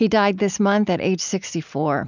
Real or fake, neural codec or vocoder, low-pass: real; none; 7.2 kHz